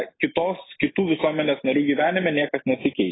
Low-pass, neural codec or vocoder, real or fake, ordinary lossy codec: 7.2 kHz; none; real; AAC, 16 kbps